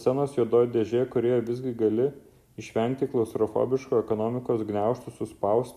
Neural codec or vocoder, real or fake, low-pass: none; real; 14.4 kHz